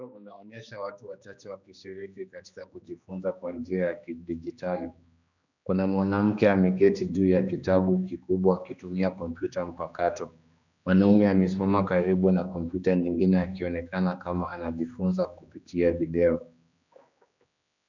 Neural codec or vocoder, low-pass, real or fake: codec, 16 kHz, 2 kbps, X-Codec, HuBERT features, trained on general audio; 7.2 kHz; fake